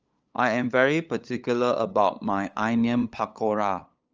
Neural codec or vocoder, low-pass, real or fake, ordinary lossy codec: codec, 16 kHz, 8 kbps, FunCodec, trained on LibriTTS, 25 frames a second; 7.2 kHz; fake; Opus, 24 kbps